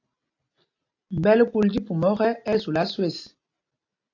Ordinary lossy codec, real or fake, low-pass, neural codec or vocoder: AAC, 48 kbps; real; 7.2 kHz; none